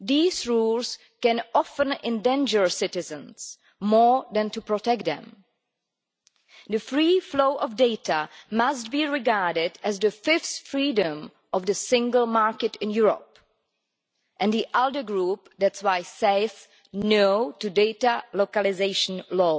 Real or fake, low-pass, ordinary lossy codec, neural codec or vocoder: real; none; none; none